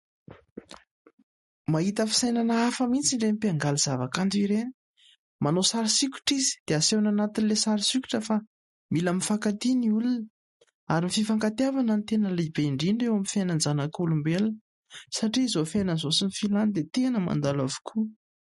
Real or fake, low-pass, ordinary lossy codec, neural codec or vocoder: real; 19.8 kHz; MP3, 48 kbps; none